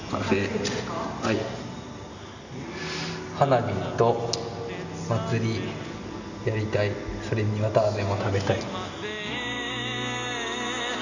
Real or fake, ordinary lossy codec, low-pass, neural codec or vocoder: real; none; 7.2 kHz; none